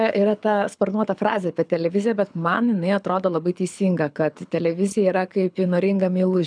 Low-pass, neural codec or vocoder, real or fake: 9.9 kHz; codec, 24 kHz, 6 kbps, HILCodec; fake